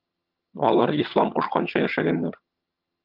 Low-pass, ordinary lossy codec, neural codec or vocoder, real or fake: 5.4 kHz; Opus, 32 kbps; vocoder, 22.05 kHz, 80 mel bands, HiFi-GAN; fake